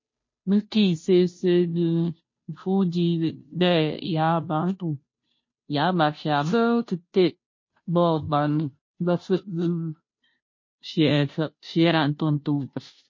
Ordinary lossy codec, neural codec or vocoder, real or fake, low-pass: MP3, 32 kbps; codec, 16 kHz, 0.5 kbps, FunCodec, trained on Chinese and English, 25 frames a second; fake; 7.2 kHz